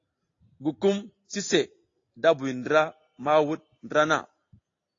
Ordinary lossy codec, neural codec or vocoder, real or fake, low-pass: AAC, 32 kbps; none; real; 7.2 kHz